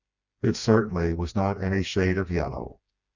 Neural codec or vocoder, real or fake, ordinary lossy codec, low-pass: codec, 16 kHz, 2 kbps, FreqCodec, smaller model; fake; Opus, 64 kbps; 7.2 kHz